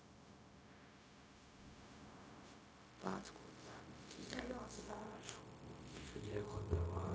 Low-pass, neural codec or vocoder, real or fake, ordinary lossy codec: none; codec, 16 kHz, 0.4 kbps, LongCat-Audio-Codec; fake; none